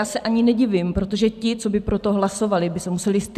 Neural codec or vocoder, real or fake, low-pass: vocoder, 44.1 kHz, 128 mel bands every 256 samples, BigVGAN v2; fake; 14.4 kHz